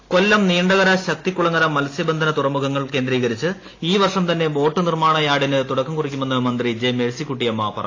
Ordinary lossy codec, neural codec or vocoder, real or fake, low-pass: AAC, 32 kbps; none; real; 7.2 kHz